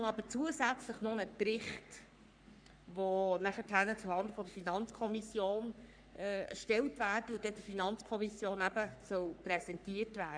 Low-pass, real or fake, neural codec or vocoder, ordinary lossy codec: 9.9 kHz; fake; codec, 44.1 kHz, 3.4 kbps, Pupu-Codec; none